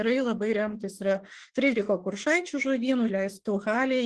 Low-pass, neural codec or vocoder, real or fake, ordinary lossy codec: 10.8 kHz; autoencoder, 48 kHz, 32 numbers a frame, DAC-VAE, trained on Japanese speech; fake; Opus, 16 kbps